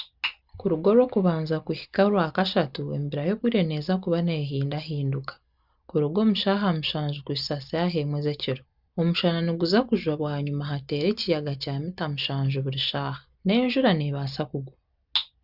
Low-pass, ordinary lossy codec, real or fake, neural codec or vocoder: 5.4 kHz; Opus, 64 kbps; real; none